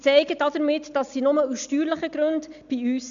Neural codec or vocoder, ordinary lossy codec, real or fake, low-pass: none; none; real; 7.2 kHz